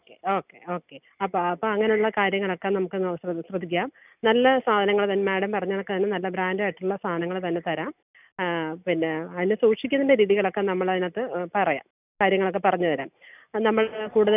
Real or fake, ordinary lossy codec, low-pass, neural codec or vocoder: real; none; 3.6 kHz; none